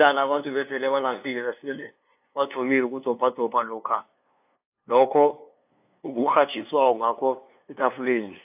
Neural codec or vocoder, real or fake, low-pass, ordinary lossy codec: codec, 16 kHz, 2 kbps, FunCodec, trained on LibriTTS, 25 frames a second; fake; 3.6 kHz; AAC, 32 kbps